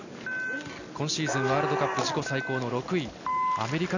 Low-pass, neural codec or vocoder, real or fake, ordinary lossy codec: 7.2 kHz; none; real; none